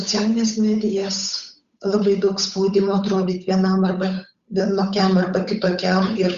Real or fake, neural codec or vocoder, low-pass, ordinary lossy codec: fake; codec, 16 kHz, 8 kbps, FunCodec, trained on Chinese and English, 25 frames a second; 7.2 kHz; Opus, 64 kbps